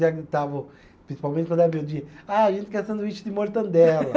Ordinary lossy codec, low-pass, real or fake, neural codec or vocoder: none; none; real; none